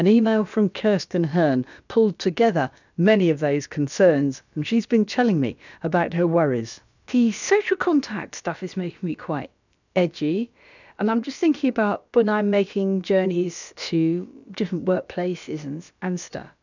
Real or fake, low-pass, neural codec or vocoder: fake; 7.2 kHz; codec, 16 kHz, about 1 kbps, DyCAST, with the encoder's durations